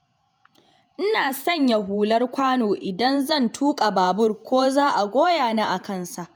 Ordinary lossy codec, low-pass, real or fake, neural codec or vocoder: none; none; fake; vocoder, 48 kHz, 128 mel bands, Vocos